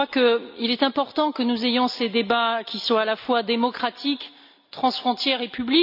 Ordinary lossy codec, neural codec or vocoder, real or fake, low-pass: none; none; real; 5.4 kHz